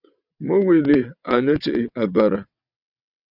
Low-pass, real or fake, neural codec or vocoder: 5.4 kHz; fake; vocoder, 22.05 kHz, 80 mel bands, WaveNeXt